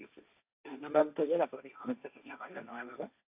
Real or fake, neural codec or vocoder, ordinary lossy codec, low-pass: fake; codec, 16 kHz, 1.1 kbps, Voila-Tokenizer; AAC, 32 kbps; 3.6 kHz